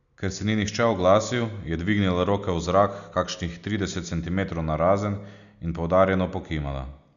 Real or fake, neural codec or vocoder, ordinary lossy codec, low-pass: real; none; none; 7.2 kHz